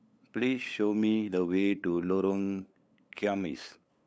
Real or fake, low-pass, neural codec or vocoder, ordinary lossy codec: fake; none; codec, 16 kHz, 8 kbps, FunCodec, trained on LibriTTS, 25 frames a second; none